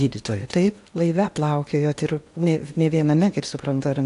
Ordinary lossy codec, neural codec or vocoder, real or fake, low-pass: AAC, 64 kbps; codec, 16 kHz in and 24 kHz out, 0.8 kbps, FocalCodec, streaming, 65536 codes; fake; 10.8 kHz